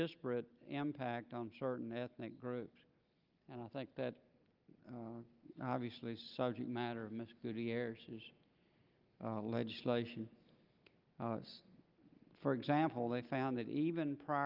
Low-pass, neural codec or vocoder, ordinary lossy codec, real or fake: 5.4 kHz; none; Opus, 32 kbps; real